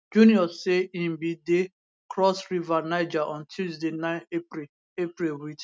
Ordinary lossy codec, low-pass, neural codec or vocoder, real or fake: none; none; none; real